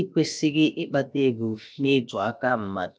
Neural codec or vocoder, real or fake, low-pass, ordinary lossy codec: codec, 16 kHz, about 1 kbps, DyCAST, with the encoder's durations; fake; none; none